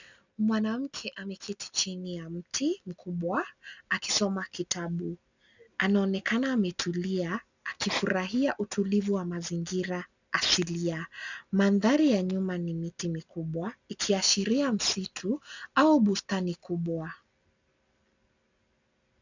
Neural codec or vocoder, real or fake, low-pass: none; real; 7.2 kHz